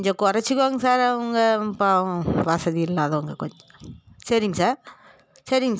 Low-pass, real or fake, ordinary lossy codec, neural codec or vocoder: none; real; none; none